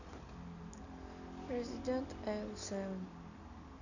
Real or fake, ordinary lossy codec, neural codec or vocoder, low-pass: real; none; none; 7.2 kHz